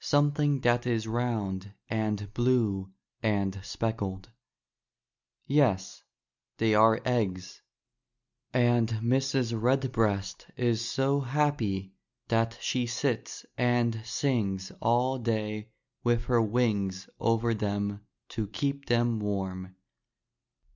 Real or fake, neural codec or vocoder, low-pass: real; none; 7.2 kHz